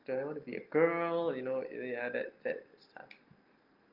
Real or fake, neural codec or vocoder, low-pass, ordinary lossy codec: real; none; 5.4 kHz; Opus, 32 kbps